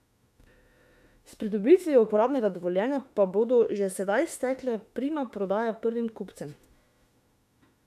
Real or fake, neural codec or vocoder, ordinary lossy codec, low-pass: fake; autoencoder, 48 kHz, 32 numbers a frame, DAC-VAE, trained on Japanese speech; none; 14.4 kHz